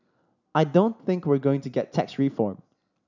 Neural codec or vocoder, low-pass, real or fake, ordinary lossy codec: none; 7.2 kHz; real; none